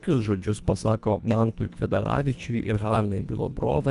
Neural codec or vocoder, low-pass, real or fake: codec, 24 kHz, 1.5 kbps, HILCodec; 10.8 kHz; fake